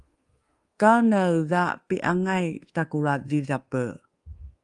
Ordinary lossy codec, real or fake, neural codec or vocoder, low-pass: Opus, 24 kbps; fake; codec, 24 kHz, 1.2 kbps, DualCodec; 10.8 kHz